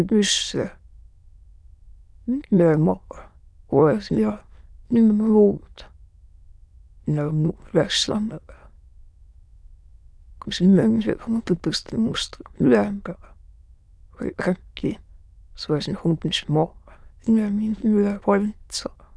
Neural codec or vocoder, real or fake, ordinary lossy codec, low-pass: autoencoder, 22.05 kHz, a latent of 192 numbers a frame, VITS, trained on many speakers; fake; none; none